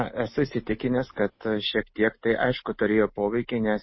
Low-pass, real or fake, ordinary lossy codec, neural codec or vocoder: 7.2 kHz; real; MP3, 24 kbps; none